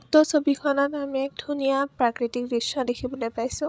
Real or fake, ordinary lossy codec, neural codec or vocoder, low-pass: fake; none; codec, 16 kHz, 16 kbps, FreqCodec, larger model; none